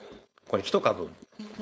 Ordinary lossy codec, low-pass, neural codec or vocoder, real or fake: none; none; codec, 16 kHz, 4.8 kbps, FACodec; fake